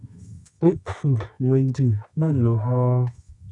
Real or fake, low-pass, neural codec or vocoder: fake; 10.8 kHz; codec, 24 kHz, 0.9 kbps, WavTokenizer, medium music audio release